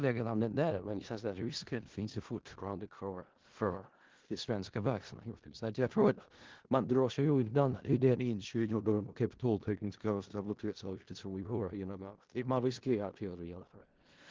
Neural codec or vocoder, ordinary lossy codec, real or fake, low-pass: codec, 16 kHz in and 24 kHz out, 0.4 kbps, LongCat-Audio-Codec, four codebook decoder; Opus, 16 kbps; fake; 7.2 kHz